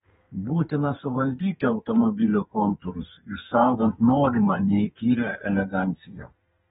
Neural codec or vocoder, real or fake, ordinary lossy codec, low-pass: codec, 32 kHz, 1.9 kbps, SNAC; fake; AAC, 16 kbps; 14.4 kHz